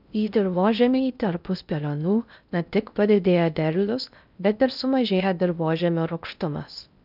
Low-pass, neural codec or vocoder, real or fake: 5.4 kHz; codec, 16 kHz in and 24 kHz out, 0.6 kbps, FocalCodec, streaming, 2048 codes; fake